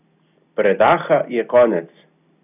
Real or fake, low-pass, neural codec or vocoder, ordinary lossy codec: real; 3.6 kHz; none; none